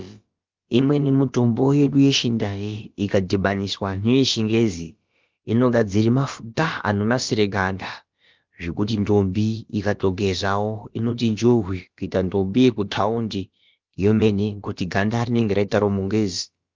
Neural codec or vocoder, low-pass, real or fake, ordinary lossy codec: codec, 16 kHz, about 1 kbps, DyCAST, with the encoder's durations; 7.2 kHz; fake; Opus, 32 kbps